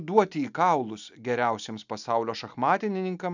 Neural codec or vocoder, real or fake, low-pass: none; real; 7.2 kHz